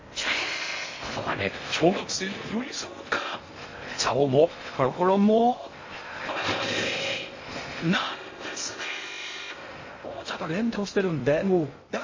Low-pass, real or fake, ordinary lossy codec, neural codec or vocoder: 7.2 kHz; fake; MP3, 32 kbps; codec, 16 kHz in and 24 kHz out, 0.6 kbps, FocalCodec, streaming, 2048 codes